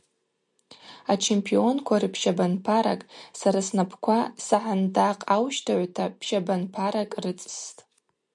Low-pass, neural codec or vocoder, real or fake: 10.8 kHz; none; real